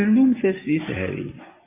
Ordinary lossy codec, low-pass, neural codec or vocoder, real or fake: MP3, 24 kbps; 3.6 kHz; none; real